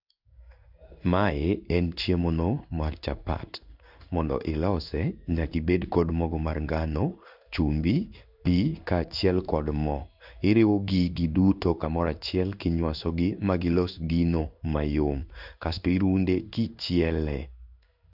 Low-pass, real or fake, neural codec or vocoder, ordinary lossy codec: 5.4 kHz; fake; codec, 16 kHz in and 24 kHz out, 1 kbps, XY-Tokenizer; none